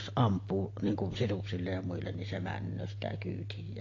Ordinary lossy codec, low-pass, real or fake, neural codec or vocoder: AAC, 32 kbps; 7.2 kHz; real; none